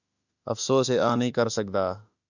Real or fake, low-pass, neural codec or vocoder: fake; 7.2 kHz; autoencoder, 48 kHz, 32 numbers a frame, DAC-VAE, trained on Japanese speech